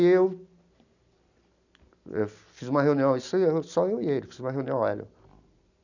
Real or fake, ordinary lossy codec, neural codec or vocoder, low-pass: real; none; none; 7.2 kHz